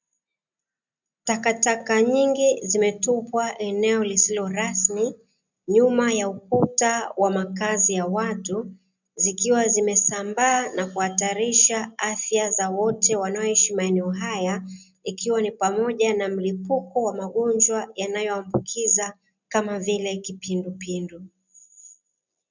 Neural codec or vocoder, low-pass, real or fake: none; 7.2 kHz; real